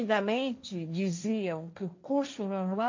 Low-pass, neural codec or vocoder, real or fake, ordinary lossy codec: 7.2 kHz; codec, 16 kHz, 1.1 kbps, Voila-Tokenizer; fake; MP3, 48 kbps